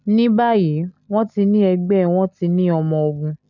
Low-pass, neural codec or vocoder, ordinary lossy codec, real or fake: 7.2 kHz; none; none; real